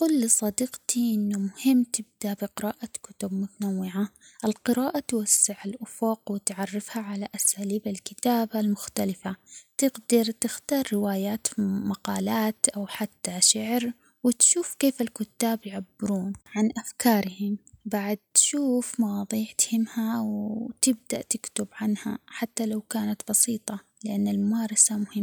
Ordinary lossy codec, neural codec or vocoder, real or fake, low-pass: none; none; real; none